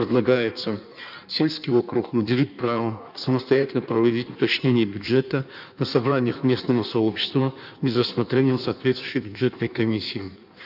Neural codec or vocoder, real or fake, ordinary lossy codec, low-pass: codec, 16 kHz in and 24 kHz out, 1.1 kbps, FireRedTTS-2 codec; fake; none; 5.4 kHz